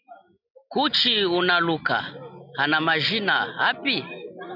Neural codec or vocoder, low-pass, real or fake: none; 5.4 kHz; real